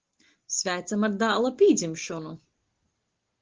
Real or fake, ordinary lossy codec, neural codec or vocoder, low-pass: real; Opus, 16 kbps; none; 7.2 kHz